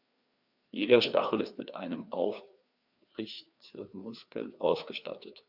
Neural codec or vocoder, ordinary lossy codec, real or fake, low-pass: codec, 16 kHz, 2 kbps, FreqCodec, larger model; Opus, 64 kbps; fake; 5.4 kHz